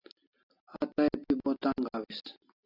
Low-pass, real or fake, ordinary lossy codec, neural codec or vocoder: 5.4 kHz; real; AAC, 24 kbps; none